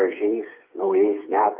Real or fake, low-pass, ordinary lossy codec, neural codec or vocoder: fake; 3.6 kHz; Opus, 32 kbps; codec, 16 kHz, 4 kbps, FreqCodec, smaller model